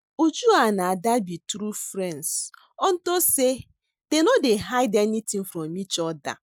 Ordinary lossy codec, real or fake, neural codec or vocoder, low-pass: none; real; none; none